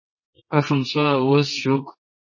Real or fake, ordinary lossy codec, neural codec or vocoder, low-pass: fake; MP3, 32 kbps; codec, 24 kHz, 0.9 kbps, WavTokenizer, medium music audio release; 7.2 kHz